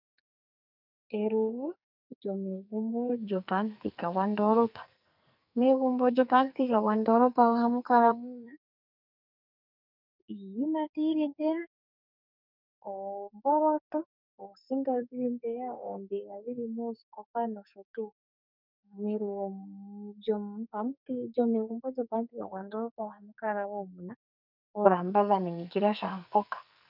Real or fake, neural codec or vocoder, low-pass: fake; codec, 44.1 kHz, 2.6 kbps, SNAC; 5.4 kHz